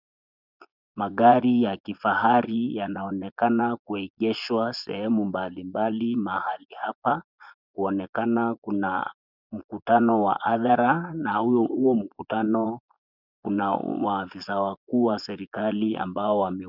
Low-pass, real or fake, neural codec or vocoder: 5.4 kHz; fake; vocoder, 24 kHz, 100 mel bands, Vocos